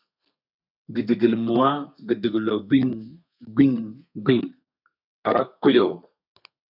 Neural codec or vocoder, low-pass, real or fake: codec, 32 kHz, 1.9 kbps, SNAC; 5.4 kHz; fake